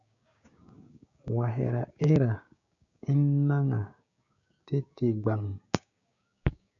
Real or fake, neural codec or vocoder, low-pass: fake; codec, 16 kHz, 6 kbps, DAC; 7.2 kHz